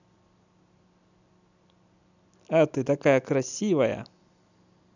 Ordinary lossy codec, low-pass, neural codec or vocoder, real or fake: none; 7.2 kHz; none; real